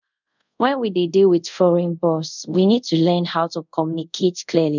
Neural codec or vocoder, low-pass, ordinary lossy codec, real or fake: codec, 24 kHz, 0.5 kbps, DualCodec; 7.2 kHz; none; fake